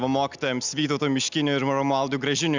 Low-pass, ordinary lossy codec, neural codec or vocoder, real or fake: 7.2 kHz; Opus, 64 kbps; none; real